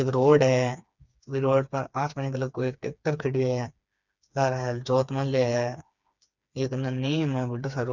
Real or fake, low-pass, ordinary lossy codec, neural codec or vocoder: fake; 7.2 kHz; none; codec, 16 kHz, 4 kbps, FreqCodec, smaller model